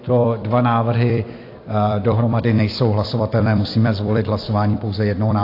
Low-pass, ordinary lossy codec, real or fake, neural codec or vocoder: 5.4 kHz; AAC, 32 kbps; fake; vocoder, 44.1 kHz, 128 mel bands every 256 samples, BigVGAN v2